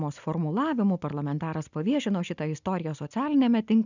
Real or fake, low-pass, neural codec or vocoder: real; 7.2 kHz; none